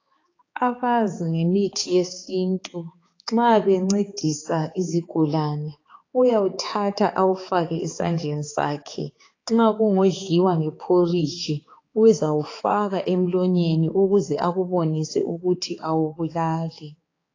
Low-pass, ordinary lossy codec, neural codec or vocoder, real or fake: 7.2 kHz; AAC, 32 kbps; codec, 16 kHz, 4 kbps, X-Codec, HuBERT features, trained on balanced general audio; fake